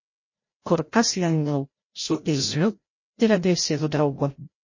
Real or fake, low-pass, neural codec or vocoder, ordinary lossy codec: fake; 7.2 kHz; codec, 16 kHz, 0.5 kbps, FreqCodec, larger model; MP3, 32 kbps